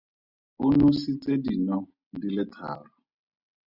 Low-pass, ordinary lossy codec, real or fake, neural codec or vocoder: 5.4 kHz; Opus, 64 kbps; real; none